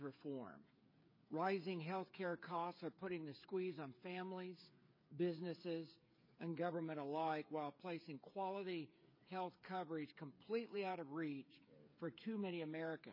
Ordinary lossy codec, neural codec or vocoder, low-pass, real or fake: MP3, 24 kbps; codec, 16 kHz, 8 kbps, FreqCodec, smaller model; 5.4 kHz; fake